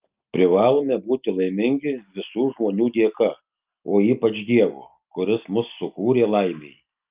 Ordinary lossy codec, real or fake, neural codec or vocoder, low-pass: Opus, 24 kbps; real; none; 3.6 kHz